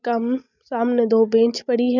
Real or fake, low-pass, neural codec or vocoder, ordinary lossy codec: real; 7.2 kHz; none; none